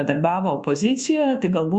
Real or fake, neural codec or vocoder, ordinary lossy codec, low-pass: fake; codec, 24 kHz, 1.2 kbps, DualCodec; Opus, 64 kbps; 10.8 kHz